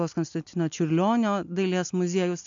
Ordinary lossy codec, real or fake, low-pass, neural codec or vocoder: MP3, 64 kbps; real; 7.2 kHz; none